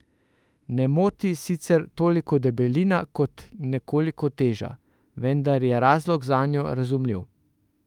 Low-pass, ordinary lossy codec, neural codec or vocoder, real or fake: 19.8 kHz; Opus, 32 kbps; autoencoder, 48 kHz, 32 numbers a frame, DAC-VAE, trained on Japanese speech; fake